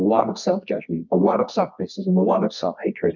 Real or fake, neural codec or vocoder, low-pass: fake; codec, 24 kHz, 0.9 kbps, WavTokenizer, medium music audio release; 7.2 kHz